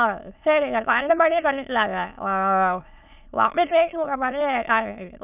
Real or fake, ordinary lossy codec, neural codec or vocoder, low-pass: fake; none; autoencoder, 22.05 kHz, a latent of 192 numbers a frame, VITS, trained on many speakers; 3.6 kHz